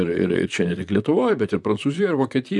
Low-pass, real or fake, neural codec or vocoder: 10.8 kHz; fake; vocoder, 44.1 kHz, 128 mel bands, Pupu-Vocoder